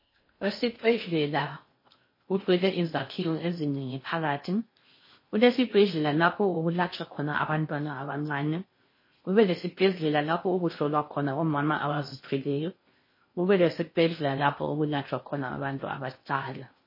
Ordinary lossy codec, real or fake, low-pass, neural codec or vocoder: MP3, 24 kbps; fake; 5.4 kHz; codec, 16 kHz in and 24 kHz out, 0.6 kbps, FocalCodec, streaming, 4096 codes